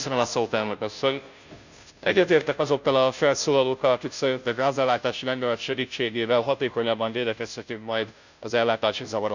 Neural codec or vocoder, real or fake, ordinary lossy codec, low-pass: codec, 16 kHz, 0.5 kbps, FunCodec, trained on Chinese and English, 25 frames a second; fake; none; 7.2 kHz